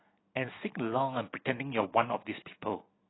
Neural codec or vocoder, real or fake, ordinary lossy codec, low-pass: none; real; AAC, 16 kbps; 7.2 kHz